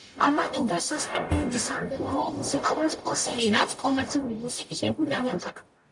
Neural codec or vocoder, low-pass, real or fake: codec, 44.1 kHz, 0.9 kbps, DAC; 10.8 kHz; fake